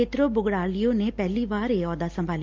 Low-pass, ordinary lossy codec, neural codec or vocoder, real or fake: 7.2 kHz; Opus, 32 kbps; none; real